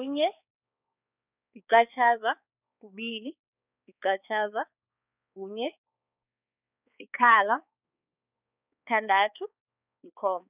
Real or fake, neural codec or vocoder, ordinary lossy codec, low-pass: fake; codec, 16 kHz, 4 kbps, X-Codec, HuBERT features, trained on balanced general audio; none; 3.6 kHz